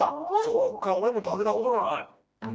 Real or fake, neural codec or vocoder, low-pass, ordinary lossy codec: fake; codec, 16 kHz, 1 kbps, FreqCodec, smaller model; none; none